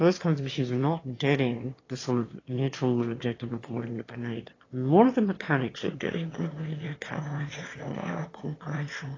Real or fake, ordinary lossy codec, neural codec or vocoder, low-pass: fake; AAC, 32 kbps; autoencoder, 22.05 kHz, a latent of 192 numbers a frame, VITS, trained on one speaker; 7.2 kHz